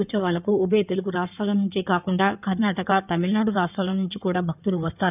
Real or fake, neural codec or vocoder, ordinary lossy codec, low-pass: fake; codec, 16 kHz in and 24 kHz out, 2.2 kbps, FireRedTTS-2 codec; none; 3.6 kHz